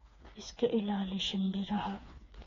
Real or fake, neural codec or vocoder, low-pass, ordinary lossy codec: fake; codec, 16 kHz, 4 kbps, FreqCodec, smaller model; 7.2 kHz; AAC, 32 kbps